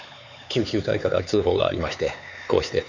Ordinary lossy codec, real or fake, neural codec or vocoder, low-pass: none; fake; codec, 16 kHz, 4 kbps, X-Codec, HuBERT features, trained on LibriSpeech; 7.2 kHz